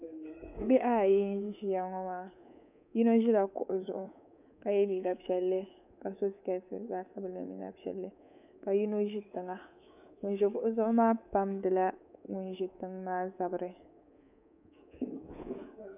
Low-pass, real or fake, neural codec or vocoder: 3.6 kHz; fake; codec, 24 kHz, 3.1 kbps, DualCodec